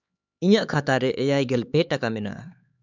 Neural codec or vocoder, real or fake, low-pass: codec, 16 kHz, 4 kbps, X-Codec, HuBERT features, trained on LibriSpeech; fake; 7.2 kHz